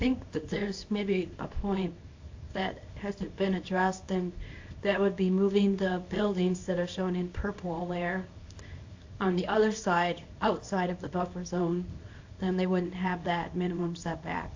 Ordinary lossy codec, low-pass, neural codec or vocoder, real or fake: AAC, 48 kbps; 7.2 kHz; codec, 24 kHz, 0.9 kbps, WavTokenizer, small release; fake